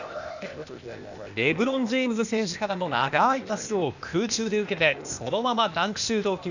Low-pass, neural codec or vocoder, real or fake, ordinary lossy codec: 7.2 kHz; codec, 16 kHz, 0.8 kbps, ZipCodec; fake; none